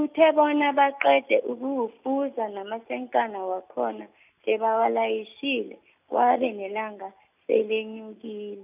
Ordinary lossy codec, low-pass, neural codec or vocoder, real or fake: none; 3.6 kHz; none; real